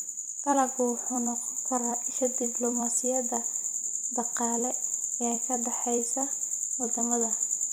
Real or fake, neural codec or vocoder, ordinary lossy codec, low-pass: fake; vocoder, 44.1 kHz, 128 mel bands every 256 samples, BigVGAN v2; none; none